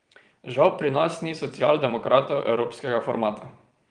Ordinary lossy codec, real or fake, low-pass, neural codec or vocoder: Opus, 24 kbps; fake; 9.9 kHz; vocoder, 22.05 kHz, 80 mel bands, WaveNeXt